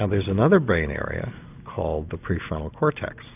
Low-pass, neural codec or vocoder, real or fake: 3.6 kHz; none; real